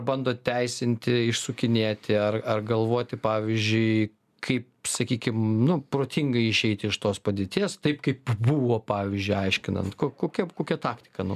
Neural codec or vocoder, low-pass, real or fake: none; 14.4 kHz; real